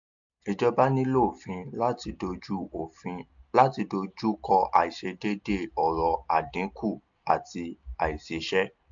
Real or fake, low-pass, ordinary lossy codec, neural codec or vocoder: real; 7.2 kHz; MP3, 64 kbps; none